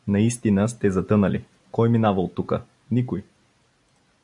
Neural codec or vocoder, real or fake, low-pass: none; real; 10.8 kHz